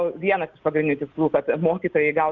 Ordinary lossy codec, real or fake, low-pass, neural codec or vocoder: Opus, 24 kbps; real; 7.2 kHz; none